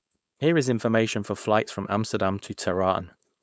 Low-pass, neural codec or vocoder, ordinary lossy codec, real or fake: none; codec, 16 kHz, 4.8 kbps, FACodec; none; fake